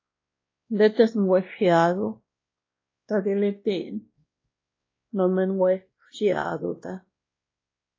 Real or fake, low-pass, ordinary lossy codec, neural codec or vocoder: fake; 7.2 kHz; AAC, 32 kbps; codec, 16 kHz, 1 kbps, X-Codec, WavLM features, trained on Multilingual LibriSpeech